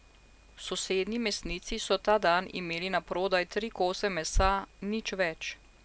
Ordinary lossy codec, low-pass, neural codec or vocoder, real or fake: none; none; none; real